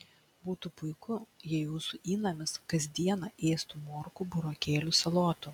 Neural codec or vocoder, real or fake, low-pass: none; real; 19.8 kHz